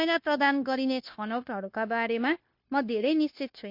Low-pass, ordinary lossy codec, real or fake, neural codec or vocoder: 5.4 kHz; MP3, 32 kbps; fake; codec, 16 kHz, 0.9 kbps, LongCat-Audio-Codec